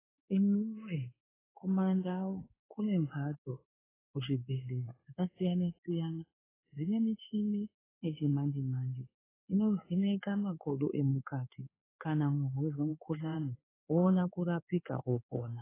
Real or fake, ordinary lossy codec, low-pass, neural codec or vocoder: fake; AAC, 16 kbps; 3.6 kHz; codec, 16 kHz in and 24 kHz out, 1 kbps, XY-Tokenizer